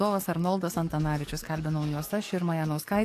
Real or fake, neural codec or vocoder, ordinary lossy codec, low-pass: fake; codec, 44.1 kHz, 7.8 kbps, DAC; AAC, 64 kbps; 14.4 kHz